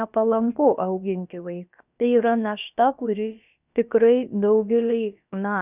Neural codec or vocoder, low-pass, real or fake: codec, 16 kHz, about 1 kbps, DyCAST, with the encoder's durations; 3.6 kHz; fake